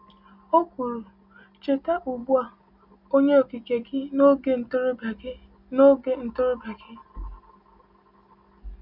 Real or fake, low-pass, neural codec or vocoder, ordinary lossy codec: real; 5.4 kHz; none; none